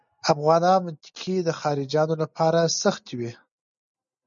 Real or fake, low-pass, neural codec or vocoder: real; 7.2 kHz; none